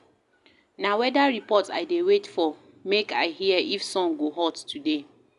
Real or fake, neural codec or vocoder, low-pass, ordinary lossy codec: real; none; 10.8 kHz; none